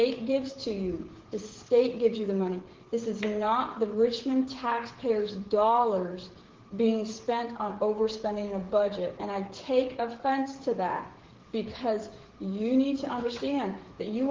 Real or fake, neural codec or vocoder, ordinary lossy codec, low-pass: fake; codec, 16 kHz, 8 kbps, FreqCodec, smaller model; Opus, 16 kbps; 7.2 kHz